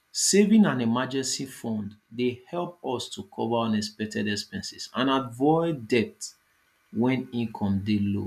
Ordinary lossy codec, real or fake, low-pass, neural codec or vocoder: none; real; 14.4 kHz; none